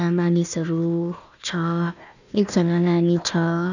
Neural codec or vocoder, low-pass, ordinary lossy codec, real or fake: codec, 16 kHz, 1 kbps, FunCodec, trained on Chinese and English, 50 frames a second; 7.2 kHz; none; fake